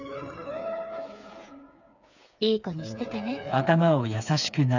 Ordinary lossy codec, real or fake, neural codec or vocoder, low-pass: none; fake; codec, 16 kHz, 4 kbps, FreqCodec, smaller model; 7.2 kHz